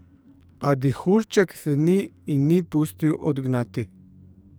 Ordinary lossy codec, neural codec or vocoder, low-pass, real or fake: none; codec, 44.1 kHz, 2.6 kbps, SNAC; none; fake